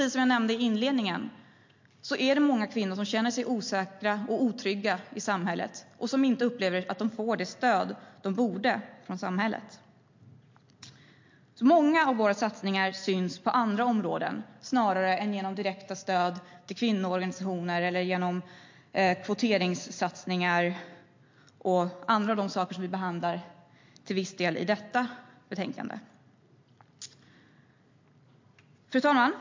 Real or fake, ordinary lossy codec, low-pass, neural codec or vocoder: real; MP3, 48 kbps; 7.2 kHz; none